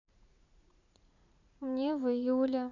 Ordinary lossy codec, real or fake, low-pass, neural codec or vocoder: none; fake; 7.2 kHz; vocoder, 44.1 kHz, 80 mel bands, Vocos